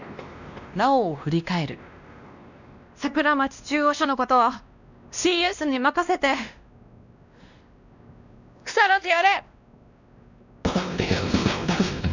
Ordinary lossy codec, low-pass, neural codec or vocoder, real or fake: none; 7.2 kHz; codec, 16 kHz, 1 kbps, X-Codec, WavLM features, trained on Multilingual LibriSpeech; fake